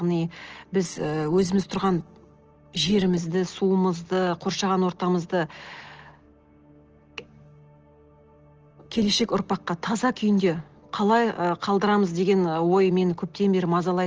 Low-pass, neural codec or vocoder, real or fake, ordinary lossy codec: 7.2 kHz; none; real; Opus, 16 kbps